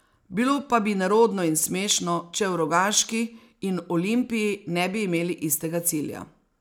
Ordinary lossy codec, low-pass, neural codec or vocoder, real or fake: none; none; none; real